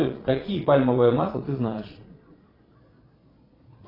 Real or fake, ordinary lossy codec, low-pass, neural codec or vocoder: fake; AAC, 24 kbps; 5.4 kHz; vocoder, 22.05 kHz, 80 mel bands, Vocos